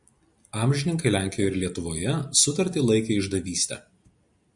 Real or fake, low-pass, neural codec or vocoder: real; 10.8 kHz; none